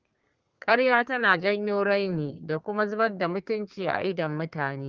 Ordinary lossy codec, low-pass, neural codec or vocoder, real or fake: Opus, 32 kbps; 7.2 kHz; codec, 32 kHz, 1.9 kbps, SNAC; fake